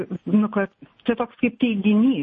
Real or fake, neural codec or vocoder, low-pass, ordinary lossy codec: real; none; 10.8 kHz; MP3, 32 kbps